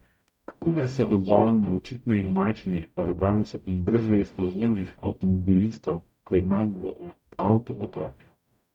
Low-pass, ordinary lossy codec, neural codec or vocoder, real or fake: 19.8 kHz; none; codec, 44.1 kHz, 0.9 kbps, DAC; fake